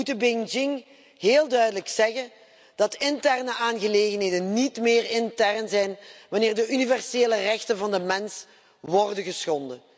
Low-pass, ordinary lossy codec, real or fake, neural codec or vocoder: none; none; real; none